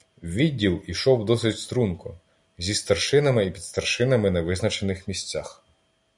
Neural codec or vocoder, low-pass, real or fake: none; 10.8 kHz; real